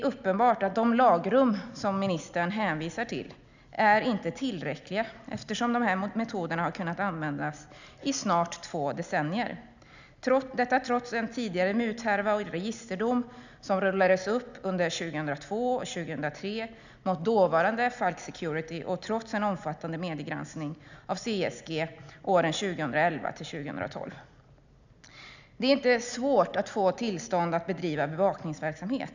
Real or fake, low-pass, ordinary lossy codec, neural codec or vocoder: real; 7.2 kHz; none; none